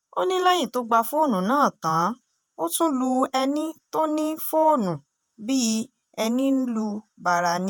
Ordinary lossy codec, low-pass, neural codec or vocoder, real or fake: none; none; vocoder, 48 kHz, 128 mel bands, Vocos; fake